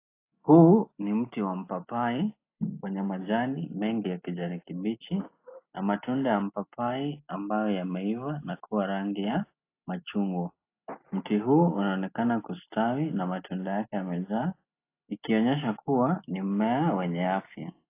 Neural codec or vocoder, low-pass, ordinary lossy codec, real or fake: none; 3.6 kHz; AAC, 24 kbps; real